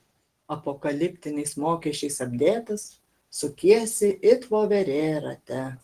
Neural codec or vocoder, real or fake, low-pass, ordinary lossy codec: none; real; 14.4 kHz; Opus, 16 kbps